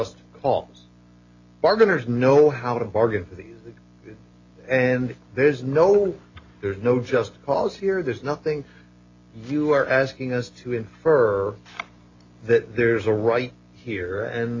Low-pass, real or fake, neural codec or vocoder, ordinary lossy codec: 7.2 kHz; real; none; AAC, 48 kbps